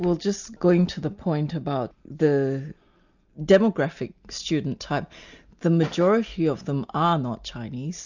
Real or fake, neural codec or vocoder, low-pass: real; none; 7.2 kHz